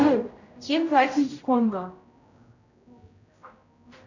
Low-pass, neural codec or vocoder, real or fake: 7.2 kHz; codec, 16 kHz, 0.5 kbps, X-Codec, HuBERT features, trained on general audio; fake